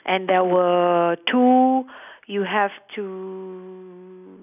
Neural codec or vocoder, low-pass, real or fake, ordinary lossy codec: none; 3.6 kHz; real; none